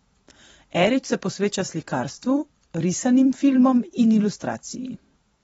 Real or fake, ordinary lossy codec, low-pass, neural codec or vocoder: fake; AAC, 24 kbps; 19.8 kHz; vocoder, 44.1 kHz, 128 mel bands every 512 samples, BigVGAN v2